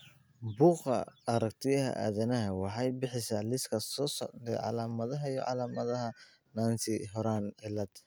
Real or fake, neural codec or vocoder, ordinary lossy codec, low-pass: real; none; none; none